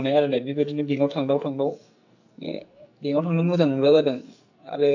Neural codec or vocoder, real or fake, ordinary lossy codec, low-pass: codec, 44.1 kHz, 2.6 kbps, SNAC; fake; AAC, 48 kbps; 7.2 kHz